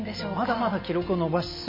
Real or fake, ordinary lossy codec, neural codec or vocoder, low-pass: real; MP3, 24 kbps; none; 5.4 kHz